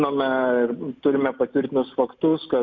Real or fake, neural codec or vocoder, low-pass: real; none; 7.2 kHz